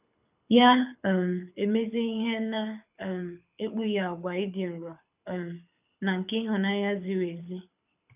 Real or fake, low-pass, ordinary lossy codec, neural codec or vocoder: fake; 3.6 kHz; none; codec, 24 kHz, 6 kbps, HILCodec